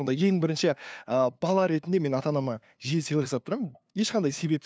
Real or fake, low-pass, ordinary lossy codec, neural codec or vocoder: fake; none; none; codec, 16 kHz, 2 kbps, FunCodec, trained on LibriTTS, 25 frames a second